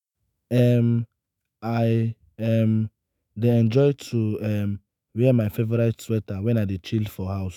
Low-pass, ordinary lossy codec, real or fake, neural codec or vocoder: 19.8 kHz; none; fake; autoencoder, 48 kHz, 128 numbers a frame, DAC-VAE, trained on Japanese speech